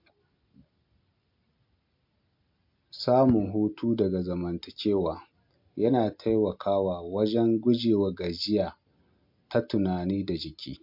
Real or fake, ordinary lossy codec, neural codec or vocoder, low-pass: real; MP3, 48 kbps; none; 5.4 kHz